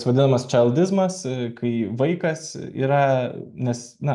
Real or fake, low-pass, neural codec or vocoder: real; 10.8 kHz; none